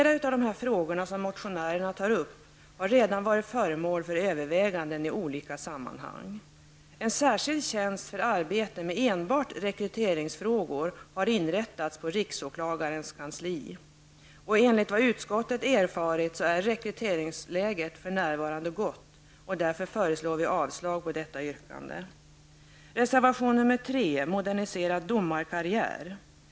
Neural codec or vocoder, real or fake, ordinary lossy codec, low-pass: none; real; none; none